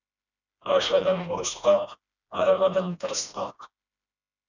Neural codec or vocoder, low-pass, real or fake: codec, 16 kHz, 1 kbps, FreqCodec, smaller model; 7.2 kHz; fake